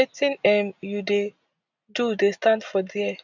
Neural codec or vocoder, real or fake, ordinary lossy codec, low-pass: none; real; none; 7.2 kHz